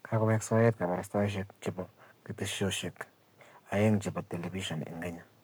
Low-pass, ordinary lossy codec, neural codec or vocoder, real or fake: none; none; codec, 44.1 kHz, 7.8 kbps, Pupu-Codec; fake